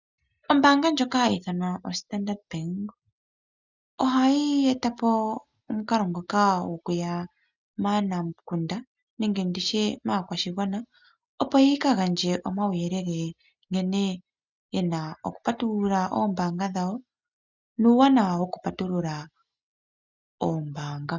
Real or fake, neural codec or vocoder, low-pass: real; none; 7.2 kHz